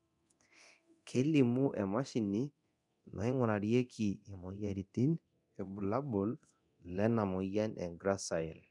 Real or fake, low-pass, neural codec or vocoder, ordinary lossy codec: fake; 10.8 kHz; codec, 24 kHz, 0.9 kbps, DualCodec; none